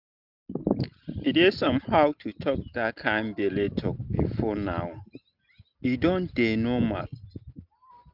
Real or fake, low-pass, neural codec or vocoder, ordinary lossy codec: real; 5.4 kHz; none; none